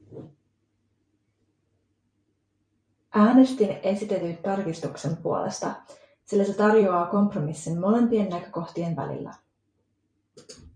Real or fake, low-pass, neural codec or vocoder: fake; 9.9 kHz; vocoder, 44.1 kHz, 128 mel bands every 256 samples, BigVGAN v2